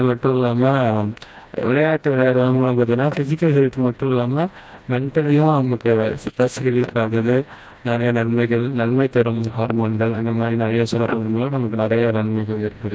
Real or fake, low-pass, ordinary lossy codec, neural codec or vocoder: fake; none; none; codec, 16 kHz, 1 kbps, FreqCodec, smaller model